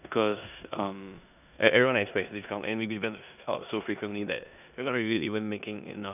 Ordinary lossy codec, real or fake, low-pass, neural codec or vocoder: none; fake; 3.6 kHz; codec, 16 kHz in and 24 kHz out, 0.9 kbps, LongCat-Audio-Codec, four codebook decoder